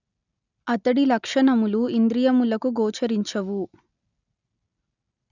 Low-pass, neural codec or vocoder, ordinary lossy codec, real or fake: 7.2 kHz; none; none; real